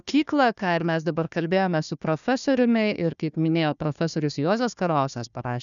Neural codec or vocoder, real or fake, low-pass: codec, 16 kHz, 1 kbps, FunCodec, trained on Chinese and English, 50 frames a second; fake; 7.2 kHz